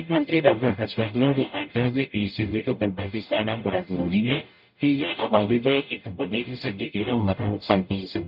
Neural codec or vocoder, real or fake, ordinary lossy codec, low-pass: codec, 44.1 kHz, 0.9 kbps, DAC; fake; none; 5.4 kHz